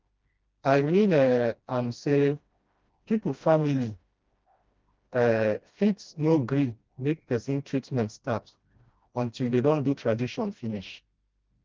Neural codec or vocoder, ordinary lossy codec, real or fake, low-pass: codec, 16 kHz, 1 kbps, FreqCodec, smaller model; Opus, 24 kbps; fake; 7.2 kHz